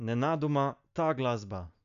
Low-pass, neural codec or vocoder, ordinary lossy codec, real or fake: 7.2 kHz; none; none; real